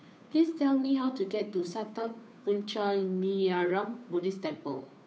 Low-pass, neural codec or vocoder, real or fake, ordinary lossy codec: none; codec, 16 kHz, 2 kbps, FunCodec, trained on Chinese and English, 25 frames a second; fake; none